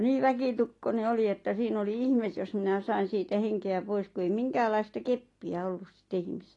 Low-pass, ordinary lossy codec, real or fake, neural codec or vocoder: 10.8 kHz; AAC, 32 kbps; real; none